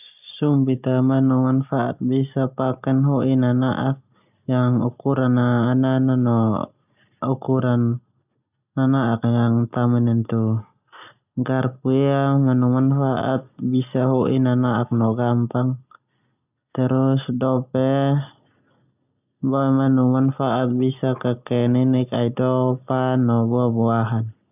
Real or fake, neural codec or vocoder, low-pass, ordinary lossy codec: real; none; 3.6 kHz; none